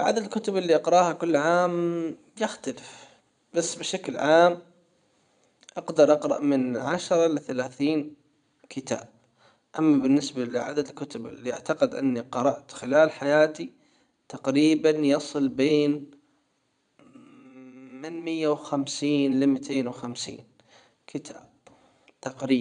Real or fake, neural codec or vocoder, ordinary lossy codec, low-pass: fake; vocoder, 22.05 kHz, 80 mel bands, Vocos; none; 9.9 kHz